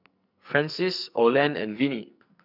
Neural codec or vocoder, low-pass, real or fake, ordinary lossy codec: codec, 44.1 kHz, 2.6 kbps, SNAC; 5.4 kHz; fake; none